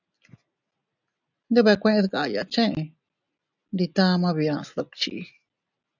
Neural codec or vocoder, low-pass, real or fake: none; 7.2 kHz; real